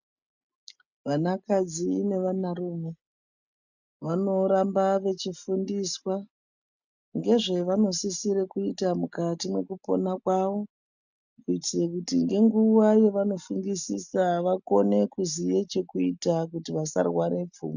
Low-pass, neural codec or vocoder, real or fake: 7.2 kHz; none; real